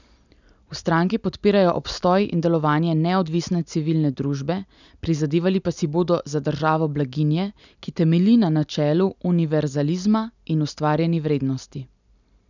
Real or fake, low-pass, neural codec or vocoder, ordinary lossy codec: real; 7.2 kHz; none; none